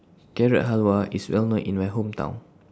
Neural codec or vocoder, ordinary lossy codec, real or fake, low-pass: none; none; real; none